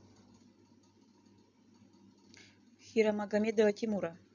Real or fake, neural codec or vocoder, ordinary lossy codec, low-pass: real; none; none; 7.2 kHz